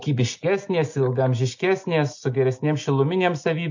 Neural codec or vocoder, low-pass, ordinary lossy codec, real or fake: none; 7.2 kHz; MP3, 48 kbps; real